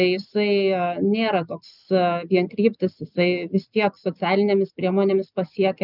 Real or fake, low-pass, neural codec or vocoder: real; 5.4 kHz; none